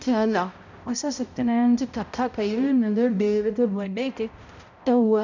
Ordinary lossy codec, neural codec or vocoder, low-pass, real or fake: none; codec, 16 kHz, 0.5 kbps, X-Codec, HuBERT features, trained on balanced general audio; 7.2 kHz; fake